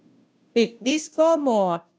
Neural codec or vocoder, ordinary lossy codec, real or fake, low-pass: codec, 16 kHz, 0.5 kbps, FunCodec, trained on Chinese and English, 25 frames a second; none; fake; none